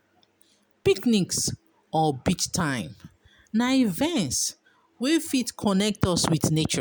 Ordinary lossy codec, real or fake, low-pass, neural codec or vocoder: none; real; none; none